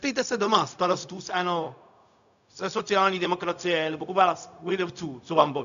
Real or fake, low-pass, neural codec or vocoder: fake; 7.2 kHz; codec, 16 kHz, 0.4 kbps, LongCat-Audio-Codec